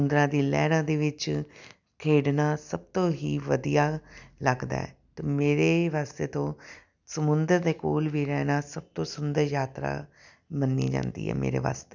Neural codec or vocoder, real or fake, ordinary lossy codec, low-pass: none; real; none; 7.2 kHz